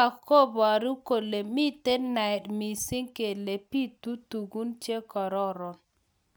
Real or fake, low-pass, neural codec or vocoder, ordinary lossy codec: real; none; none; none